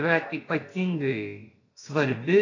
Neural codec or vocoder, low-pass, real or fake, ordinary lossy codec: codec, 16 kHz, about 1 kbps, DyCAST, with the encoder's durations; 7.2 kHz; fake; AAC, 32 kbps